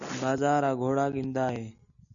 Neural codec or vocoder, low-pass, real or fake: none; 7.2 kHz; real